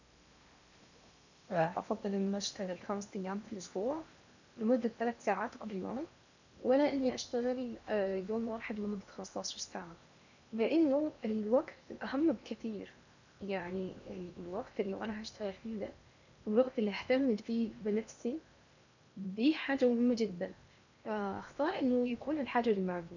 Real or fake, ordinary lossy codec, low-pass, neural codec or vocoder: fake; none; 7.2 kHz; codec, 16 kHz in and 24 kHz out, 0.8 kbps, FocalCodec, streaming, 65536 codes